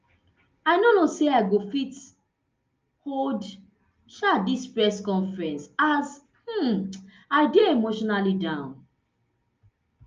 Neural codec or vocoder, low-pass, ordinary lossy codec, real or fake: none; 7.2 kHz; Opus, 24 kbps; real